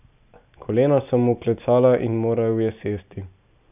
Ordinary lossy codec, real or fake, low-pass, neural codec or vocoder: none; real; 3.6 kHz; none